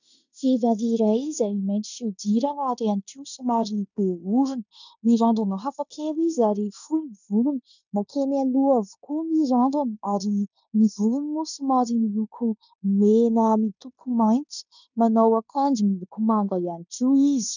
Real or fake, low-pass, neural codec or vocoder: fake; 7.2 kHz; codec, 16 kHz in and 24 kHz out, 0.9 kbps, LongCat-Audio-Codec, fine tuned four codebook decoder